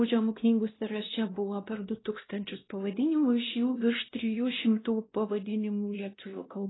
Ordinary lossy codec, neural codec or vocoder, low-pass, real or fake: AAC, 16 kbps; codec, 16 kHz, 1 kbps, X-Codec, WavLM features, trained on Multilingual LibriSpeech; 7.2 kHz; fake